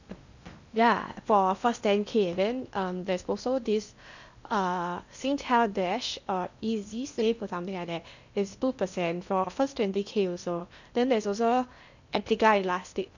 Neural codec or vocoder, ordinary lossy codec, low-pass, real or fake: codec, 16 kHz in and 24 kHz out, 0.8 kbps, FocalCodec, streaming, 65536 codes; none; 7.2 kHz; fake